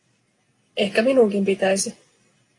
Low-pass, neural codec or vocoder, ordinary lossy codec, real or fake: 10.8 kHz; none; AAC, 32 kbps; real